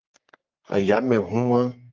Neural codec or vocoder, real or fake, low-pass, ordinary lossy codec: codec, 44.1 kHz, 3.4 kbps, Pupu-Codec; fake; 7.2 kHz; Opus, 24 kbps